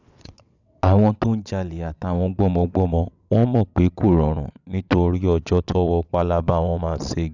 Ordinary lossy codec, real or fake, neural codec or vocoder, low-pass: none; fake; vocoder, 22.05 kHz, 80 mel bands, WaveNeXt; 7.2 kHz